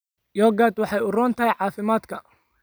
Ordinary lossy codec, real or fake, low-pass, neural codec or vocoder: none; real; none; none